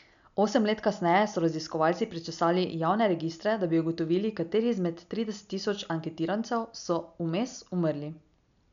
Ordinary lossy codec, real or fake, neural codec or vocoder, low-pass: none; real; none; 7.2 kHz